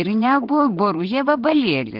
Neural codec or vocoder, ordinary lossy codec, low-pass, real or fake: vocoder, 22.05 kHz, 80 mel bands, WaveNeXt; Opus, 16 kbps; 5.4 kHz; fake